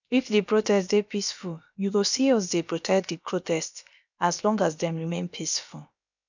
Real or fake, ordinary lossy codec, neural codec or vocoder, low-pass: fake; none; codec, 16 kHz, about 1 kbps, DyCAST, with the encoder's durations; 7.2 kHz